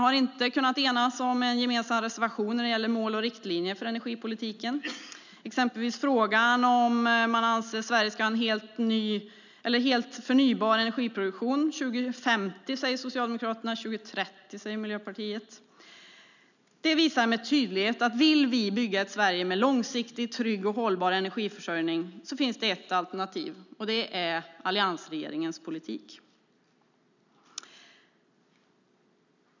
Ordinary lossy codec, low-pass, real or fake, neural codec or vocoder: none; 7.2 kHz; real; none